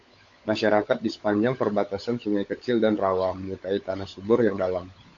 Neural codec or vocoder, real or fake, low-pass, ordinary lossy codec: codec, 16 kHz, 16 kbps, FunCodec, trained on LibriTTS, 50 frames a second; fake; 7.2 kHz; AAC, 48 kbps